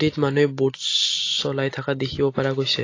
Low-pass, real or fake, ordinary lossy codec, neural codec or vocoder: 7.2 kHz; real; AAC, 32 kbps; none